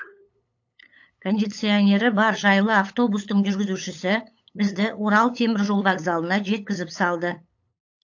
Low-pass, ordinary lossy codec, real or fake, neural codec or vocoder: 7.2 kHz; AAC, 48 kbps; fake; codec, 16 kHz, 8 kbps, FunCodec, trained on LibriTTS, 25 frames a second